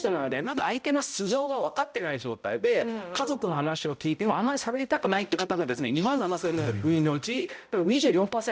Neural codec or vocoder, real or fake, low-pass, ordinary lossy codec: codec, 16 kHz, 0.5 kbps, X-Codec, HuBERT features, trained on balanced general audio; fake; none; none